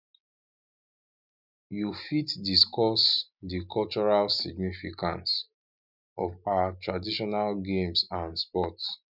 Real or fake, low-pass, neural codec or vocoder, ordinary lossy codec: real; 5.4 kHz; none; none